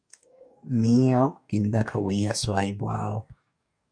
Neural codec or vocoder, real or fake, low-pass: codec, 44.1 kHz, 2.6 kbps, DAC; fake; 9.9 kHz